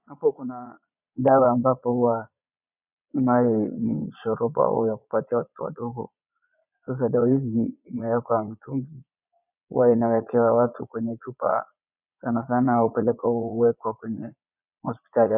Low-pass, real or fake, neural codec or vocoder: 3.6 kHz; fake; vocoder, 22.05 kHz, 80 mel bands, Vocos